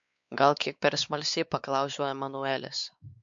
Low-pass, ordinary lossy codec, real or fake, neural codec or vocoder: 7.2 kHz; MP3, 64 kbps; fake; codec, 16 kHz, 4 kbps, X-Codec, WavLM features, trained on Multilingual LibriSpeech